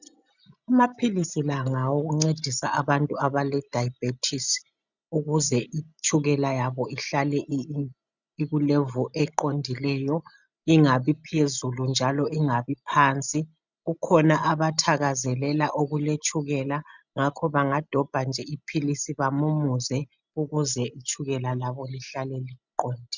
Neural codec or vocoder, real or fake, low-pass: none; real; 7.2 kHz